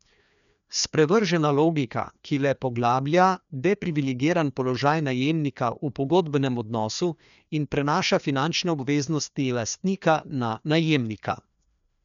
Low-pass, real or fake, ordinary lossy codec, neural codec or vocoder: 7.2 kHz; fake; none; codec, 16 kHz, 2 kbps, FreqCodec, larger model